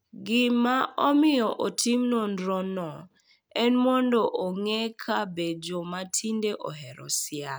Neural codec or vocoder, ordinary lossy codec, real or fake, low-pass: none; none; real; none